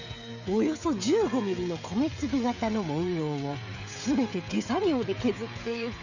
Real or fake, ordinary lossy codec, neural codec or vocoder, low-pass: fake; none; codec, 16 kHz, 16 kbps, FreqCodec, smaller model; 7.2 kHz